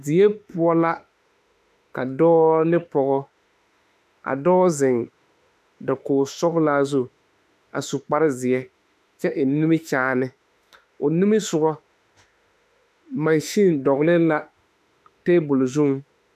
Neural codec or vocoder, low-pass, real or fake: autoencoder, 48 kHz, 32 numbers a frame, DAC-VAE, trained on Japanese speech; 14.4 kHz; fake